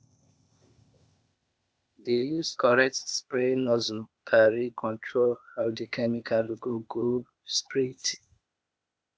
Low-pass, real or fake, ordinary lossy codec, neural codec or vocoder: none; fake; none; codec, 16 kHz, 0.8 kbps, ZipCodec